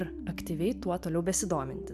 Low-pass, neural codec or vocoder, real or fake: 14.4 kHz; vocoder, 44.1 kHz, 128 mel bands every 512 samples, BigVGAN v2; fake